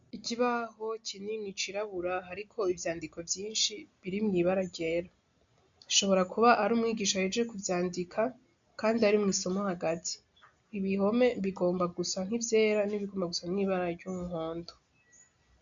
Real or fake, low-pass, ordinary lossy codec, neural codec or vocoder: real; 7.2 kHz; MP3, 64 kbps; none